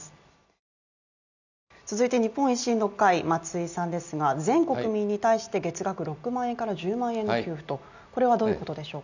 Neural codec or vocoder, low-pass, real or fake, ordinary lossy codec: none; 7.2 kHz; real; none